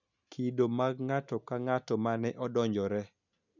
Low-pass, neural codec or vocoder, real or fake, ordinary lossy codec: 7.2 kHz; none; real; none